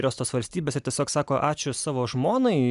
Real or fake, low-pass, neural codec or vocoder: real; 10.8 kHz; none